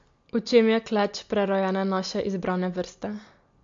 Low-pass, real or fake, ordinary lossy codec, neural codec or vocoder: 7.2 kHz; real; MP3, 48 kbps; none